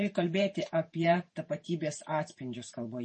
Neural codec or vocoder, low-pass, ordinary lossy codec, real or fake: vocoder, 48 kHz, 128 mel bands, Vocos; 10.8 kHz; MP3, 32 kbps; fake